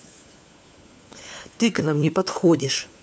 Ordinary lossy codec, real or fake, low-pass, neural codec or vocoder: none; fake; none; codec, 16 kHz, 4 kbps, FunCodec, trained on LibriTTS, 50 frames a second